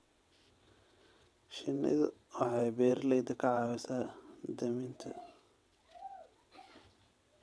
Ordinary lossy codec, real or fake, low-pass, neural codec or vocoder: none; fake; none; vocoder, 22.05 kHz, 80 mel bands, WaveNeXt